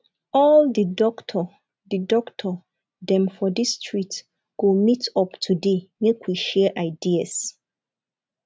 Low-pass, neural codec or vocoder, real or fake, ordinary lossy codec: none; none; real; none